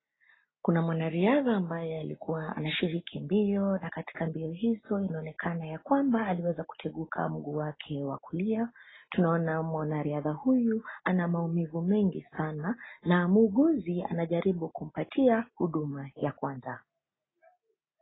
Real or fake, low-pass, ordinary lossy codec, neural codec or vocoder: real; 7.2 kHz; AAC, 16 kbps; none